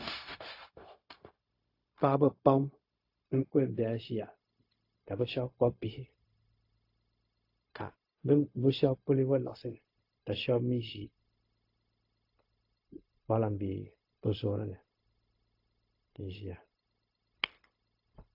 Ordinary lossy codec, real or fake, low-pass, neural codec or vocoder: AAC, 32 kbps; fake; 5.4 kHz; codec, 16 kHz, 0.4 kbps, LongCat-Audio-Codec